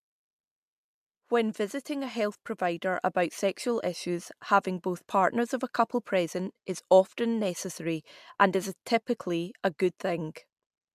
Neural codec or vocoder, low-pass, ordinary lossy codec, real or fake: none; 14.4 kHz; MP3, 96 kbps; real